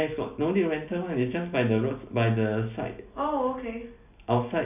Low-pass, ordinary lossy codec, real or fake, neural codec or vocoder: 3.6 kHz; none; real; none